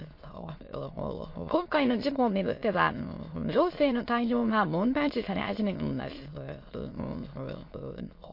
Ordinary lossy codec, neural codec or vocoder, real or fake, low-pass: MP3, 32 kbps; autoencoder, 22.05 kHz, a latent of 192 numbers a frame, VITS, trained on many speakers; fake; 5.4 kHz